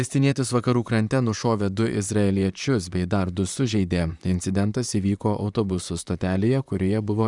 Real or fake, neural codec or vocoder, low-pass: fake; vocoder, 44.1 kHz, 128 mel bands, Pupu-Vocoder; 10.8 kHz